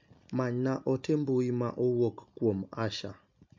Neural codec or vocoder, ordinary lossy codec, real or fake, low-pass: none; MP3, 48 kbps; real; 7.2 kHz